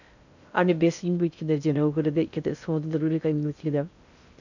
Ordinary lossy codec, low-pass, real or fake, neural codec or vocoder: none; 7.2 kHz; fake; codec, 16 kHz in and 24 kHz out, 0.6 kbps, FocalCodec, streaming, 2048 codes